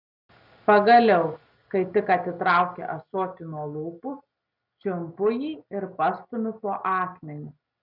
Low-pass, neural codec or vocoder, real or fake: 5.4 kHz; none; real